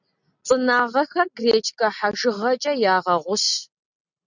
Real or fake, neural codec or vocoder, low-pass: real; none; 7.2 kHz